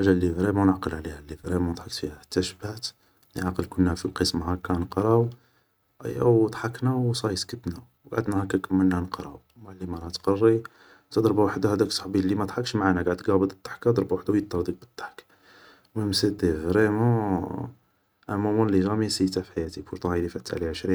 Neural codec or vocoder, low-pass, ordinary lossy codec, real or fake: none; none; none; real